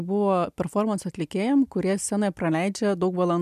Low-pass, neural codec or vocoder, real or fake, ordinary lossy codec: 14.4 kHz; none; real; MP3, 96 kbps